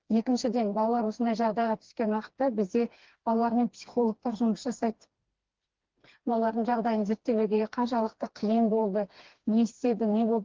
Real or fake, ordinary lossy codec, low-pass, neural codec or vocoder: fake; Opus, 16 kbps; 7.2 kHz; codec, 16 kHz, 2 kbps, FreqCodec, smaller model